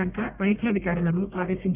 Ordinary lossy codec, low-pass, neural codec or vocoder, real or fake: none; 3.6 kHz; codec, 44.1 kHz, 1.7 kbps, Pupu-Codec; fake